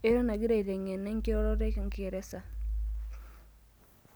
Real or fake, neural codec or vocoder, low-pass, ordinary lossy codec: real; none; none; none